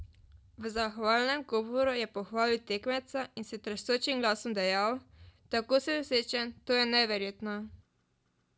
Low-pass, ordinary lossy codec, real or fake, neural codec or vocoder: none; none; real; none